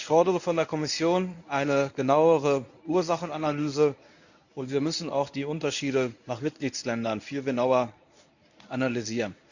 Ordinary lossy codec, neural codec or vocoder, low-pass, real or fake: none; codec, 24 kHz, 0.9 kbps, WavTokenizer, medium speech release version 1; 7.2 kHz; fake